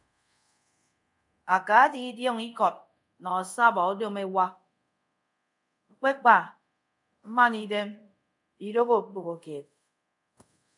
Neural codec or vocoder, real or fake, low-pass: codec, 24 kHz, 0.5 kbps, DualCodec; fake; 10.8 kHz